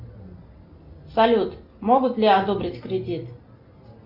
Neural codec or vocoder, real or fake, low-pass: none; real; 5.4 kHz